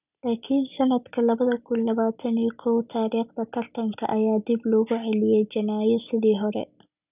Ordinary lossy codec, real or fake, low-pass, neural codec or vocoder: none; real; 3.6 kHz; none